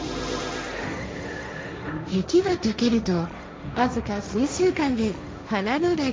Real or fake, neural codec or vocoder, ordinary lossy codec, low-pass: fake; codec, 16 kHz, 1.1 kbps, Voila-Tokenizer; none; none